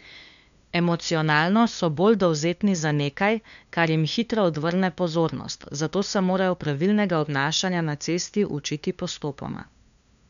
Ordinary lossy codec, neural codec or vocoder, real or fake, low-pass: none; codec, 16 kHz, 2 kbps, FunCodec, trained on LibriTTS, 25 frames a second; fake; 7.2 kHz